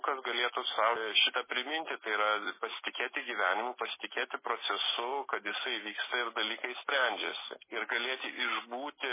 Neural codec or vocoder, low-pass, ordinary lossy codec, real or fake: none; 3.6 kHz; MP3, 16 kbps; real